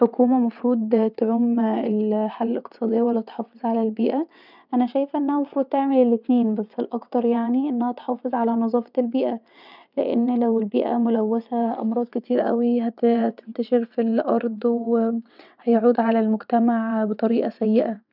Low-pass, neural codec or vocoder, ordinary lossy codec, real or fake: 5.4 kHz; vocoder, 22.05 kHz, 80 mel bands, WaveNeXt; none; fake